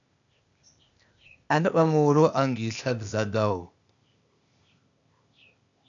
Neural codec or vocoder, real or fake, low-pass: codec, 16 kHz, 0.8 kbps, ZipCodec; fake; 7.2 kHz